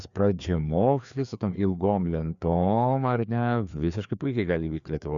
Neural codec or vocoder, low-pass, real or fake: codec, 16 kHz, 2 kbps, FreqCodec, larger model; 7.2 kHz; fake